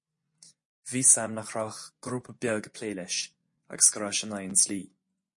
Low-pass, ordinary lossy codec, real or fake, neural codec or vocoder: 10.8 kHz; MP3, 48 kbps; fake; vocoder, 24 kHz, 100 mel bands, Vocos